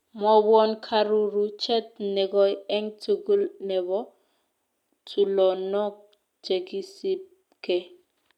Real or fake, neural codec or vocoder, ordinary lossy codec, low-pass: real; none; none; 19.8 kHz